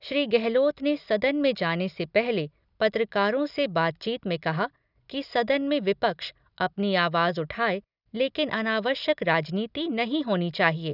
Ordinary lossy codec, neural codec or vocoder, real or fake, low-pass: none; none; real; 5.4 kHz